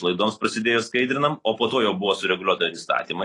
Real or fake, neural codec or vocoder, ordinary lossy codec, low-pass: real; none; AAC, 32 kbps; 10.8 kHz